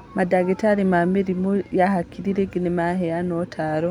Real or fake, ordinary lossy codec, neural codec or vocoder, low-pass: real; none; none; 19.8 kHz